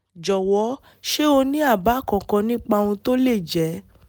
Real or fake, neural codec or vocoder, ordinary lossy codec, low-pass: real; none; none; none